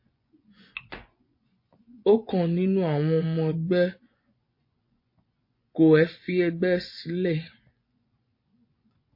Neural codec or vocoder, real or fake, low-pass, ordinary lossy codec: vocoder, 24 kHz, 100 mel bands, Vocos; fake; 5.4 kHz; MP3, 32 kbps